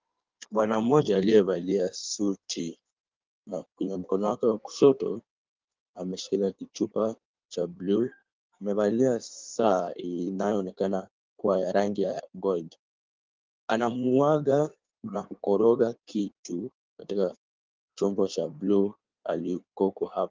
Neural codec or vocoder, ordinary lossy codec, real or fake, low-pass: codec, 16 kHz in and 24 kHz out, 1.1 kbps, FireRedTTS-2 codec; Opus, 32 kbps; fake; 7.2 kHz